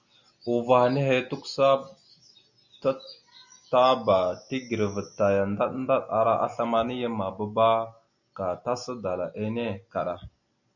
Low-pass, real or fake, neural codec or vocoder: 7.2 kHz; real; none